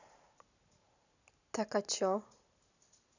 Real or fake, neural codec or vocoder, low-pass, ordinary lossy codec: real; none; 7.2 kHz; none